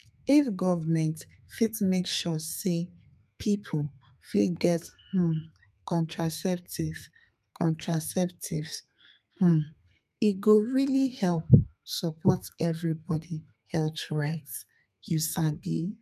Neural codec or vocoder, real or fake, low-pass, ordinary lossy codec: codec, 32 kHz, 1.9 kbps, SNAC; fake; 14.4 kHz; none